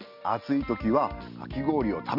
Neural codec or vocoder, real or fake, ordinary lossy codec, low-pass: none; real; none; 5.4 kHz